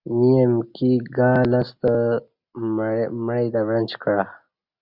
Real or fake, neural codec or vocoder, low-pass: real; none; 5.4 kHz